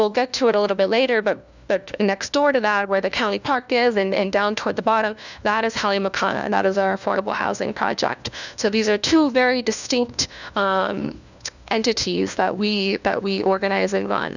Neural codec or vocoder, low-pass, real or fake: codec, 16 kHz, 1 kbps, FunCodec, trained on LibriTTS, 50 frames a second; 7.2 kHz; fake